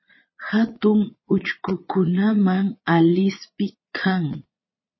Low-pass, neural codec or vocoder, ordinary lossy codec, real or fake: 7.2 kHz; vocoder, 22.05 kHz, 80 mel bands, Vocos; MP3, 24 kbps; fake